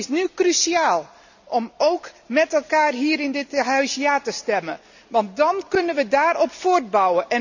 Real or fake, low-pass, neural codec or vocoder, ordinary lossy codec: real; 7.2 kHz; none; none